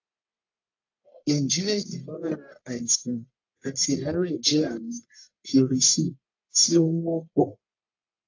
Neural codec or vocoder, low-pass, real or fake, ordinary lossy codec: codec, 44.1 kHz, 1.7 kbps, Pupu-Codec; 7.2 kHz; fake; AAC, 48 kbps